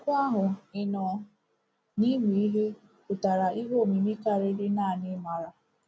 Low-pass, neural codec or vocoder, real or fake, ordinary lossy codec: none; none; real; none